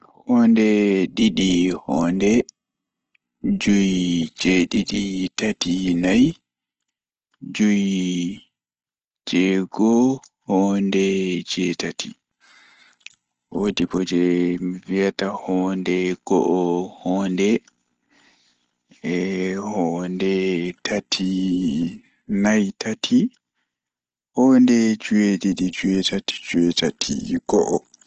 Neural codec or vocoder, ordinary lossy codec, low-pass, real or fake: none; Opus, 16 kbps; 7.2 kHz; real